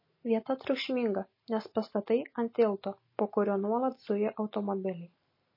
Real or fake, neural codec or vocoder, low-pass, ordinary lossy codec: real; none; 5.4 kHz; MP3, 24 kbps